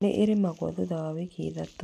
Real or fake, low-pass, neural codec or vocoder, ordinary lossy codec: real; 14.4 kHz; none; none